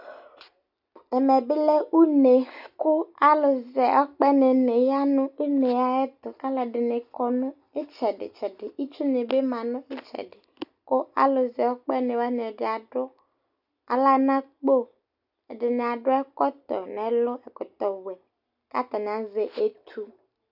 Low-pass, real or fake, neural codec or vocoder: 5.4 kHz; real; none